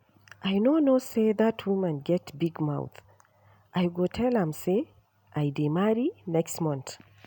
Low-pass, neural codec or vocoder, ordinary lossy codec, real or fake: 19.8 kHz; none; none; real